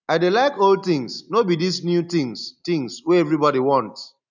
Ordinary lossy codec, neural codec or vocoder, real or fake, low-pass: none; none; real; 7.2 kHz